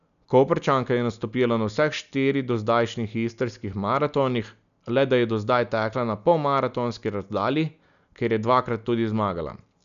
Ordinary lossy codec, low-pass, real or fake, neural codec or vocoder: none; 7.2 kHz; real; none